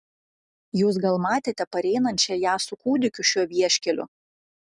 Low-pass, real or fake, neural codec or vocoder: 10.8 kHz; real; none